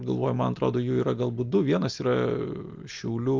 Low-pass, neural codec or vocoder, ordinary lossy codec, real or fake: 7.2 kHz; none; Opus, 32 kbps; real